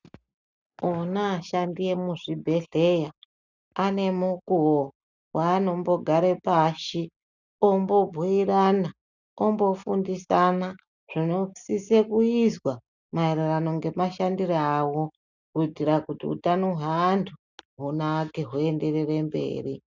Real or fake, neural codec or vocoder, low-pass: real; none; 7.2 kHz